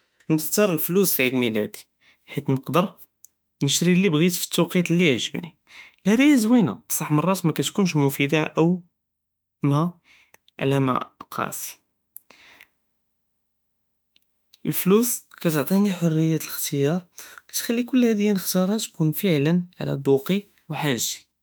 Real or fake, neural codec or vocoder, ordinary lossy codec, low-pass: fake; autoencoder, 48 kHz, 32 numbers a frame, DAC-VAE, trained on Japanese speech; none; none